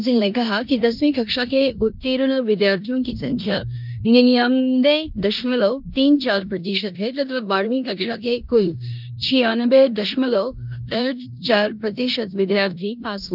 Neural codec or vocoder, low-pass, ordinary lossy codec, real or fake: codec, 16 kHz in and 24 kHz out, 0.9 kbps, LongCat-Audio-Codec, four codebook decoder; 5.4 kHz; none; fake